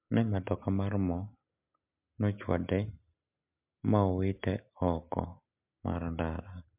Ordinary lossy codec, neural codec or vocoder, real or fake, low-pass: MP3, 32 kbps; none; real; 3.6 kHz